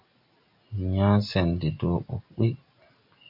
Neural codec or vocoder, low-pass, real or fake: none; 5.4 kHz; real